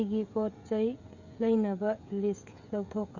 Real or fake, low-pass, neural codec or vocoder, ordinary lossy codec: fake; 7.2 kHz; codec, 16 kHz, 16 kbps, FreqCodec, smaller model; Opus, 64 kbps